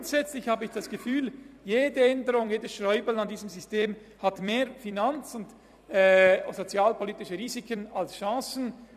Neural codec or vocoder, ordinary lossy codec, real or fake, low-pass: vocoder, 44.1 kHz, 128 mel bands every 256 samples, BigVGAN v2; none; fake; 14.4 kHz